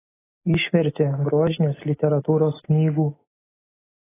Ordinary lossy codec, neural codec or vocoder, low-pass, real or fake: AAC, 16 kbps; none; 3.6 kHz; real